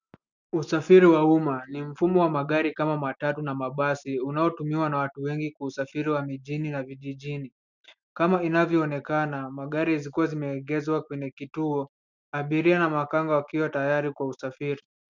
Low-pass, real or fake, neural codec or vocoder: 7.2 kHz; real; none